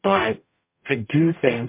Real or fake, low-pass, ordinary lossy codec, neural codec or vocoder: fake; 3.6 kHz; MP3, 32 kbps; codec, 44.1 kHz, 0.9 kbps, DAC